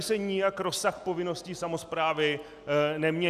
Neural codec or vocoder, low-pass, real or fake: none; 14.4 kHz; real